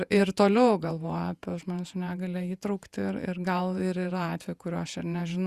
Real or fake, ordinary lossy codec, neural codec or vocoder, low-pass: fake; Opus, 64 kbps; vocoder, 48 kHz, 128 mel bands, Vocos; 14.4 kHz